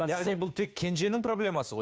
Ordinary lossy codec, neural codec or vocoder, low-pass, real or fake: none; codec, 16 kHz, 2 kbps, FunCodec, trained on Chinese and English, 25 frames a second; none; fake